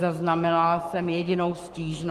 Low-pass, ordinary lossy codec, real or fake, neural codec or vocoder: 14.4 kHz; Opus, 24 kbps; fake; codec, 44.1 kHz, 7.8 kbps, Pupu-Codec